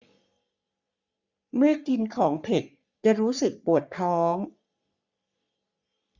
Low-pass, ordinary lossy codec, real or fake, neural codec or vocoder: 7.2 kHz; Opus, 64 kbps; fake; codec, 44.1 kHz, 3.4 kbps, Pupu-Codec